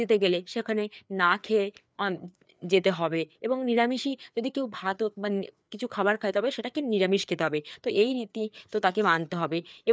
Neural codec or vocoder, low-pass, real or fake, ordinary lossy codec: codec, 16 kHz, 4 kbps, FreqCodec, larger model; none; fake; none